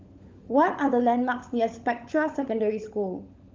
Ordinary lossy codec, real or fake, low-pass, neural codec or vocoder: Opus, 32 kbps; fake; 7.2 kHz; codec, 16 kHz, 16 kbps, FunCodec, trained on LibriTTS, 50 frames a second